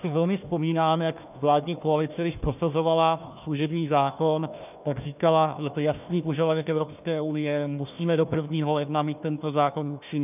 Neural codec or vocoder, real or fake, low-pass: codec, 16 kHz, 1 kbps, FunCodec, trained on Chinese and English, 50 frames a second; fake; 3.6 kHz